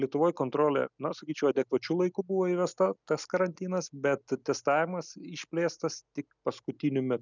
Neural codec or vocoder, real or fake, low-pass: none; real; 7.2 kHz